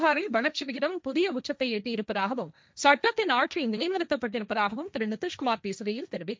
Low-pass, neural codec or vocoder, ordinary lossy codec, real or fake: none; codec, 16 kHz, 1.1 kbps, Voila-Tokenizer; none; fake